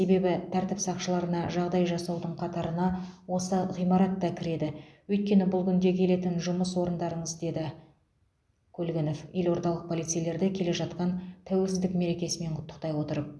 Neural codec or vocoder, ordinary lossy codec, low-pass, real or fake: none; none; none; real